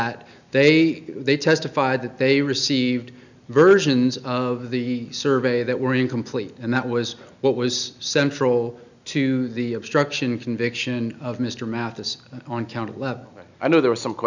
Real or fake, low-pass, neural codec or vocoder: real; 7.2 kHz; none